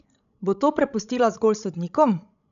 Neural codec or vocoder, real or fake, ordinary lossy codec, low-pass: codec, 16 kHz, 16 kbps, FreqCodec, larger model; fake; none; 7.2 kHz